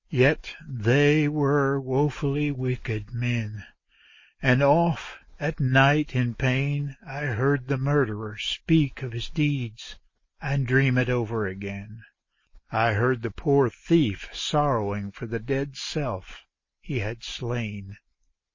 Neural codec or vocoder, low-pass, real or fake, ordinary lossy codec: none; 7.2 kHz; real; MP3, 32 kbps